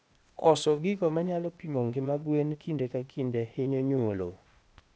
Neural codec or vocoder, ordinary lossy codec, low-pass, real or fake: codec, 16 kHz, 0.8 kbps, ZipCodec; none; none; fake